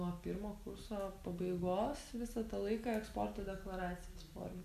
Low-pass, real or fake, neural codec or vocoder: 14.4 kHz; real; none